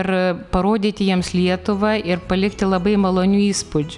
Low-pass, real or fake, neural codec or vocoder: 10.8 kHz; real; none